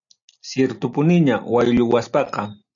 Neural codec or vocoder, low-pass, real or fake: none; 7.2 kHz; real